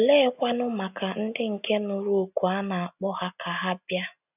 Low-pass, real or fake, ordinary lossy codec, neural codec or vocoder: 3.6 kHz; real; none; none